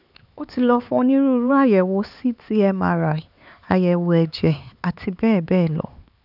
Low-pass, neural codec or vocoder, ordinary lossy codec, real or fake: 5.4 kHz; codec, 16 kHz, 4 kbps, X-Codec, HuBERT features, trained on LibriSpeech; none; fake